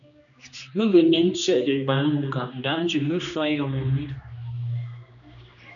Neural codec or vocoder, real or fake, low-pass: codec, 16 kHz, 2 kbps, X-Codec, HuBERT features, trained on general audio; fake; 7.2 kHz